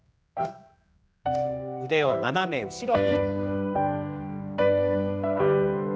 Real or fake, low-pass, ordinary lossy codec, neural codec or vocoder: fake; none; none; codec, 16 kHz, 2 kbps, X-Codec, HuBERT features, trained on general audio